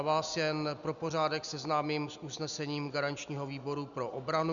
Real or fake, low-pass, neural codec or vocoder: real; 7.2 kHz; none